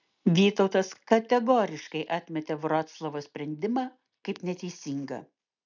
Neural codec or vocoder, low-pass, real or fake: none; 7.2 kHz; real